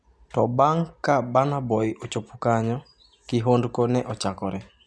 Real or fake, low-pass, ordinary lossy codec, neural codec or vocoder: real; none; none; none